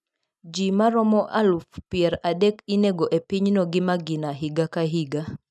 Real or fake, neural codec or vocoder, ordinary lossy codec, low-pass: real; none; none; none